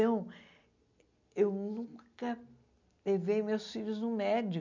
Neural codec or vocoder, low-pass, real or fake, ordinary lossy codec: none; 7.2 kHz; real; none